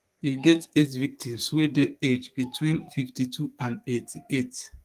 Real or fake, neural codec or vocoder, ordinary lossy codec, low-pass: fake; codec, 32 kHz, 1.9 kbps, SNAC; Opus, 32 kbps; 14.4 kHz